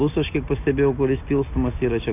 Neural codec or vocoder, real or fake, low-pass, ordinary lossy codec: none; real; 3.6 kHz; AAC, 32 kbps